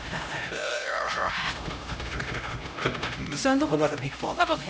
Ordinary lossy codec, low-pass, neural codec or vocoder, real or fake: none; none; codec, 16 kHz, 0.5 kbps, X-Codec, HuBERT features, trained on LibriSpeech; fake